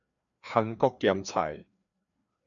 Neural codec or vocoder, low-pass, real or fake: codec, 16 kHz, 2 kbps, FreqCodec, larger model; 7.2 kHz; fake